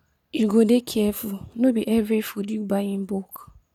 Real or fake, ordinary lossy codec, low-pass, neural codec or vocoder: real; none; 19.8 kHz; none